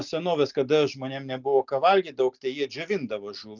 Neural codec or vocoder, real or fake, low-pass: none; real; 7.2 kHz